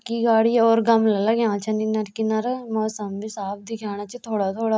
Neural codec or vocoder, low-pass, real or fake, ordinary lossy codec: none; none; real; none